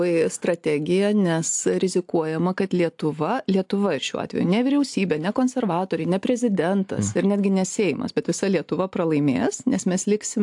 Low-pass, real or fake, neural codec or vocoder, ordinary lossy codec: 10.8 kHz; real; none; AAC, 64 kbps